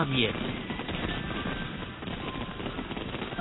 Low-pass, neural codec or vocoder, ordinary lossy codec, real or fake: 7.2 kHz; none; AAC, 16 kbps; real